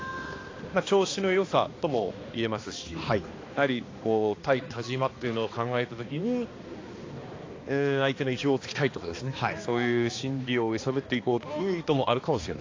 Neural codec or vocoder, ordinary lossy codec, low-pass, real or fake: codec, 16 kHz, 2 kbps, X-Codec, HuBERT features, trained on balanced general audio; AAC, 32 kbps; 7.2 kHz; fake